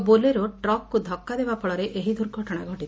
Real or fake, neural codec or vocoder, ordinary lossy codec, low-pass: real; none; none; none